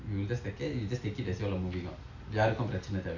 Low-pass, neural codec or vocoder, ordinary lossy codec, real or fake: 7.2 kHz; none; none; real